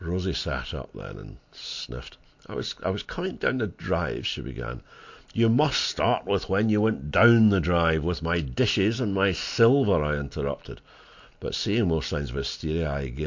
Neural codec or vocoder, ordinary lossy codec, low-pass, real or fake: none; MP3, 48 kbps; 7.2 kHz; real